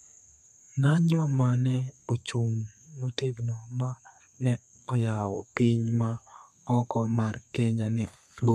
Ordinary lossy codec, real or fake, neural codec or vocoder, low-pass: none; fake; codec, 32 kHz, 1.9 kbps, SNAC; 14.4 kHz